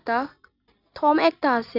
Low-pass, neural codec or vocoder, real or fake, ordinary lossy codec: 5.4 kHz; none; real; none